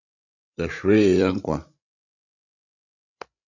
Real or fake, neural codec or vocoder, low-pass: fake; codec, 16 kHz, 8 kbps, FreqCodec, larger model; 7.2 kHz